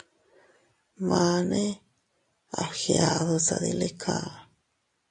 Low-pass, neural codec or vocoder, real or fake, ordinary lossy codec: 10.8 kHz; none; real; AAC, 48 kbps